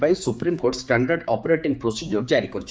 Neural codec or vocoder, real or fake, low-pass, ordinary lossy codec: codec, 16 kHz, 4 kbps, X-Codec, HuBERT features, trained on general audio; fake; none; none